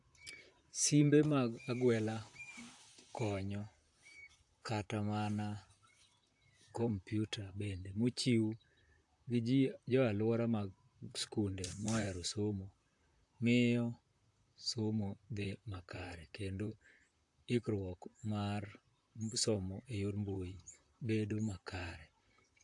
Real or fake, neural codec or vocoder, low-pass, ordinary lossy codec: fake; vocoder, 44.1 kHz, 128 mel bands, Pupu-Vocoder; 10.8 kHz; AAC, 64 kbps